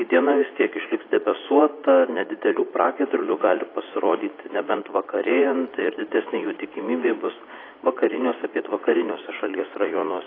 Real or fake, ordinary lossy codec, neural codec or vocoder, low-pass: fake; AAC, 24 kbps; vocoder, 44.1 kHz, 80 mel bands, Vocos; 5.4 kHz